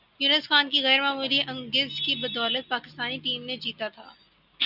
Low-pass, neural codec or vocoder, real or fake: 5.4 kHz; none; real